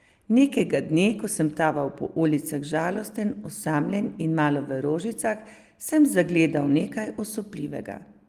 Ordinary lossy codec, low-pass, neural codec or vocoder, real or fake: Opus, 24 kbps; 14.4 kHz; none; real